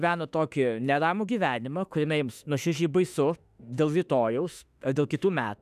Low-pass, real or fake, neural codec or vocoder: 14.4 kHz; fake; autoencoder, 48 kHz, 32 numbers a frame, DAC-VAE, trained on Japanese speech